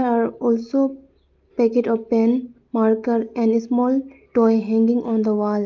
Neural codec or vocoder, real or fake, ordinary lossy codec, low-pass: none; real; Opus, 32 kbps; 7.2 kHz